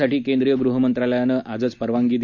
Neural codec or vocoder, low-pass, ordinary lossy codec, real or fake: none; 7.2 kHz; none; real